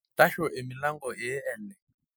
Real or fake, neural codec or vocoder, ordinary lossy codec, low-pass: real; none; none; none